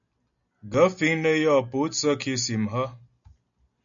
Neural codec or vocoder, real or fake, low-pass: none; real; 7.2 kHz